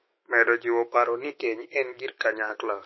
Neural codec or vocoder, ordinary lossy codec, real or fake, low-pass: autoencoder, 48 kHz, 128 numbers a frame, DAC-VAE, trained on Japanese speech; MP3, 24 kbps; fake; 7.2 kHz